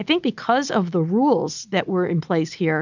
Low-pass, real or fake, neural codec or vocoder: 7.2 kHz; real; none